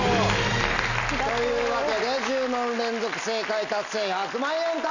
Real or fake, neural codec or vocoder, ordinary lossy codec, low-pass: real; none; none; 7.2 kHz